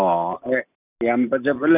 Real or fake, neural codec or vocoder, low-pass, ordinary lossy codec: real; none; 3.6 kHz; none